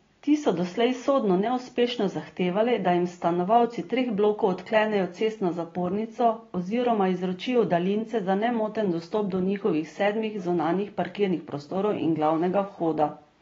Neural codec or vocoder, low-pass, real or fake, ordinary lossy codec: none; 7.2 kHz; real; AAC, 24 kbps